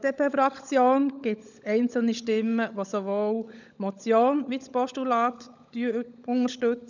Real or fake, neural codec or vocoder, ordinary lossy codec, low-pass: fake; codec, 16 kHz, 16 kbps, FunCodec, trained on LibriTTS, 50 frames a second; none; 7.2 kHz